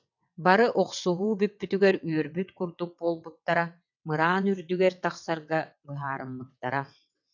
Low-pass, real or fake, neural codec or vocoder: 7.2 kHz; fake; vocoder, 22.05 kHz, 80 mel bands, WaveNeXt